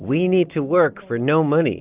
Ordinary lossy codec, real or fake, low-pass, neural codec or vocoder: Opus, 24 kbps; real; 3.6 kHz; none